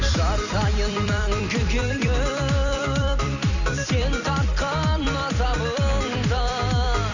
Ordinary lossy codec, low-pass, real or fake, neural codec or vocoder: none; 7.2 kHz; fake; vocoder, 44.1 kHz, 128 mel bands every 256 samples, BigVGAN v2